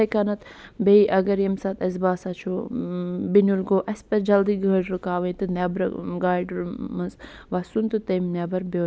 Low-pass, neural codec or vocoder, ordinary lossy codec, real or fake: none; none; none; real